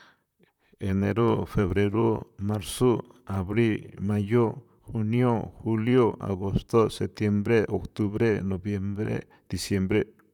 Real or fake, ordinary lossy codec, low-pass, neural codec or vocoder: fake; none; 19.8 kHz; vocoder, 44.1 kHz, 128 mel bands, Pupu-Vocoder